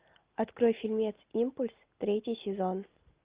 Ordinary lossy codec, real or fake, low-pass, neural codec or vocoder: Opus, 32 kbps; real; 3.6 kHz; none